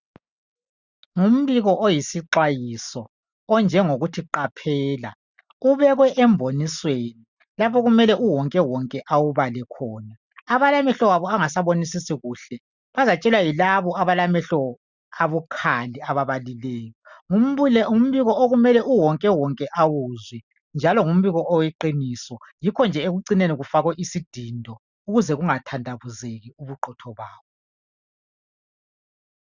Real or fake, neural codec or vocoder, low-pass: real; none; 7.2 kHz